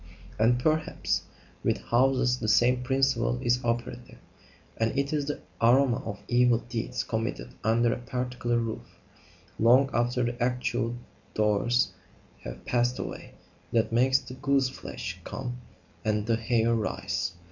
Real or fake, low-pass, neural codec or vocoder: real; 7.2 kHz; none